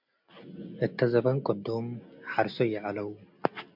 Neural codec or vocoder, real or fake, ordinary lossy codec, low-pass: none; real; MP3, 48 kbps; 5.4 kHz